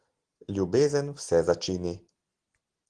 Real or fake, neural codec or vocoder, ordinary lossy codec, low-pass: real; none; Opus, 16 kbps; 9.9 kHz